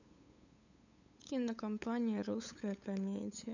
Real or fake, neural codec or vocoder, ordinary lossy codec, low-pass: fake; codec, 16 kHz, 8 kbps, FunCodec, trained on LibriTTS, 25 frames a second; none; 7.2 kHz